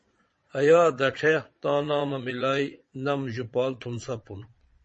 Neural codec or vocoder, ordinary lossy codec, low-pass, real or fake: vocoder, 22.05 kHz, 80 mel bands, Vocos; MP3, 32 kbps; 9.9 kHz; fake